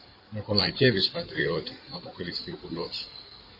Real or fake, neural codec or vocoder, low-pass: fake; codec, 16 kHz in and 24 kHz out, 2.2 kbps, FireRedTTS-2 codec; 5.4 kHz